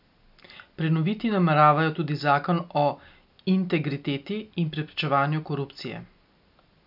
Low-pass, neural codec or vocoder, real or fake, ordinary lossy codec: 5.4 kHz; none; real; none